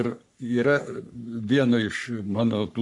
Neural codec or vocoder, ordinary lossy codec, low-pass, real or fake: codec, 44.1 kHz, 3.4 kbps, Pupu-Codec; MP3, 96 kbps; 10.8 kHz; fake